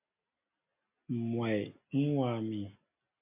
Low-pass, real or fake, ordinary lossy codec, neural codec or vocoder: 3.6 kHz; real; MP3, 32 kbps; none